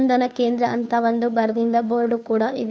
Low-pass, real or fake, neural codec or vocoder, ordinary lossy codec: none; fake; codec, 16 kHz, 2 kbps, FunCodec, trained on Chinese and English, 25 frames a second; none